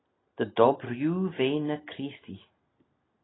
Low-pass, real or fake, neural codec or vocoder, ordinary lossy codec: 7.2 kHz; real; none; AAC, 16 kbps